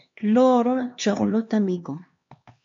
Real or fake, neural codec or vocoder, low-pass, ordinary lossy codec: fake; codec, 16 kHz, 2 kbps, X-Codec, HuBERT features, trained on LibriSpeech; 7.2 kHz; MP3, 48 kbps